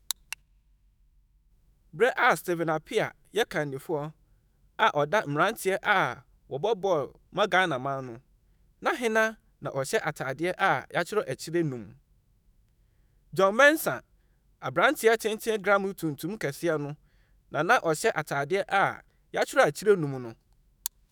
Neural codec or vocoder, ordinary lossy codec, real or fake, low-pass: autoencoder, 48 kHz, 128 numbers a frame, DAC-VAE, trained on Japanese speech; none; fake; none